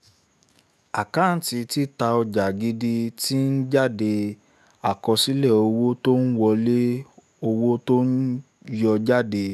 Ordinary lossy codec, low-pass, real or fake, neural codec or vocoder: none; 14.4 kHz; fake; autoencoder, 48 kHz, 128 numbers a frame, DAC-VAE, trained on Japanese speech